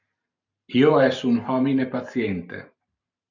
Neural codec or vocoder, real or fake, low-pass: vocoder, 44.1 kHz, 128 mel bands every 512 samples, BigVGAN v2; fake; 7.2 kHz